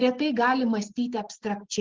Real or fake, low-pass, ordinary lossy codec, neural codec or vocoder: real; 7.2 kHz; Opus, 16 kbps; none